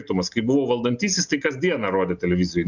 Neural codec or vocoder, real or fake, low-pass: none; real; 7.2 kHz